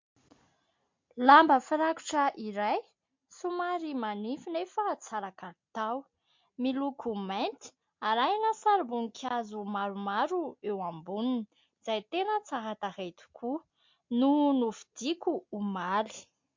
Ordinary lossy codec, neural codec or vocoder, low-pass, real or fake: MP3, 48 kbps; none; 7.2 kHz; real